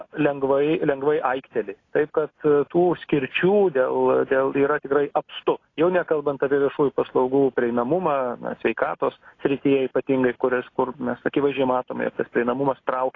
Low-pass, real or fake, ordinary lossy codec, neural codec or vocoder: 7.2 kHz; real; AAC, 32 kbps; none